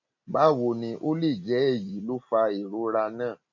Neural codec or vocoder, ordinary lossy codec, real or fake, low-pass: none; none; real; 7.2 kHz